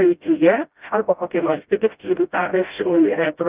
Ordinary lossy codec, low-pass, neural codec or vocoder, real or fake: Opus, 32 kbps; 3.6 kHz; codec, 16 kHz, 0.5 kbps, FreqCodec, smaller model; fake